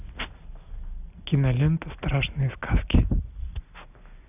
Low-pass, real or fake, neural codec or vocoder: 3.6 kHz; real; none